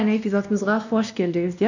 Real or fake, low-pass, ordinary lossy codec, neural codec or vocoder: fake; 7.2 kHz; none; codec, 16 kHz, 1 kbps, X-Codec, WavLM features, trained on Multilingual LibriSpeech